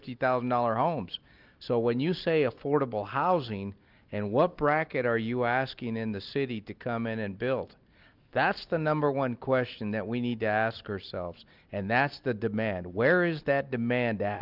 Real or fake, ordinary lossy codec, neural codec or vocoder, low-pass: real; Opus, 24 kbps; none; 5.4 kHz